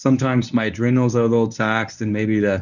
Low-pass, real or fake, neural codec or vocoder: 7.2 kHz; fake; codec, 24 kHz, 0.9 kbps, WavTokenizer, medium speech release version 1